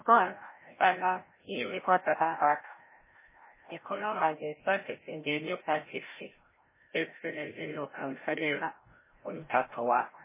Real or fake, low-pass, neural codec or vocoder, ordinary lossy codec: fake; 3.6 kHz; codec, 16 kHz, 0.5 kbps, FreqCodec, larger model; MP3, 16 kbps